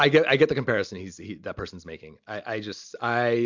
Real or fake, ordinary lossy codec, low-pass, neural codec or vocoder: real; MP3, 64 kbps; 7.2 kHz; none